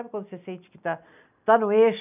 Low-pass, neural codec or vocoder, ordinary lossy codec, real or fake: 3.6 kHz; none; none; real